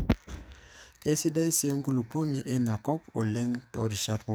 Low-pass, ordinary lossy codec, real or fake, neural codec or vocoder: none; none; fake; codec, 44.1 kHz, 2.6 kbps, SNAC